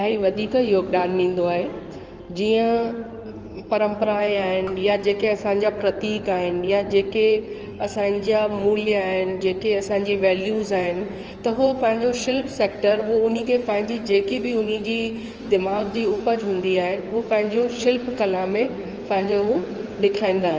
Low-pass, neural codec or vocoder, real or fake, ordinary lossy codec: 7.2 kHz; codec, 16 kHz in and 24 kHz out, 2.2 kbps, FireRedTTS-2 codec; fake; Opus, 24 kbps